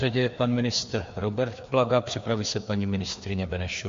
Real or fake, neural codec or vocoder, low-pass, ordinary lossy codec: fake; codec, 16 kHz, 2 kbps, FreqCodec, larger model; 7.2 kHz; MP3, 48 kbps